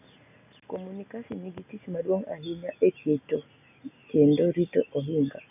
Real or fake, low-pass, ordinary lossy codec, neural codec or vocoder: real; 3.6 kHz; none; none